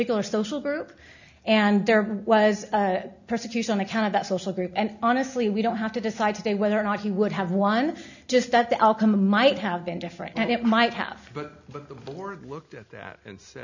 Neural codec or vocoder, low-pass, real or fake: none; 7.2 kHz; real